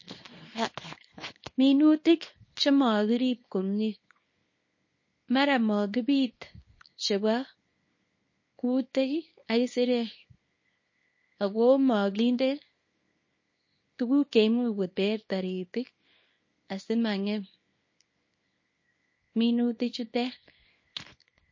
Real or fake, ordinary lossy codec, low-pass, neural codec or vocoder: fake; MP3, 32 kbps; 7.2 kHz; codec, 24 kHz, 0.9 kbps, WavTokenizer, small release